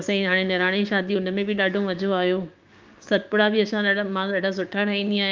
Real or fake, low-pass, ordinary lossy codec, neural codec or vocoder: fake; 7.2 kHz; Opus, 24 kbps; codec, 16 kHz, 6 kbps, DAC